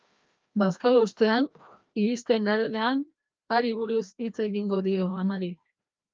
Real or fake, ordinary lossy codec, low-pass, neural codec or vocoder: fake; Opus, 24 kbps; 7.2 kHz; codec, 16 kHz, 1 kbps, FreqCodec, larger model